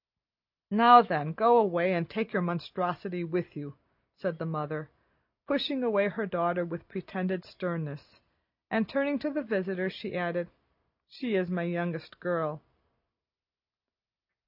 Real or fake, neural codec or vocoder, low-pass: real; none; 5.4 kHz